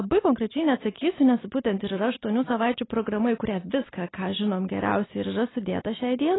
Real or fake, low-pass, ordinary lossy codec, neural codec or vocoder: real; 7.2 kHz; AAC, 16 kbps; none